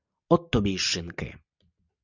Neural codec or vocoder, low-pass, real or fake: none; 7.2 kHz; real